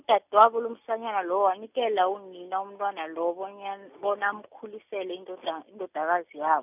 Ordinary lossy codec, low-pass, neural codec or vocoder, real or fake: none; 3.6 kHz; none; real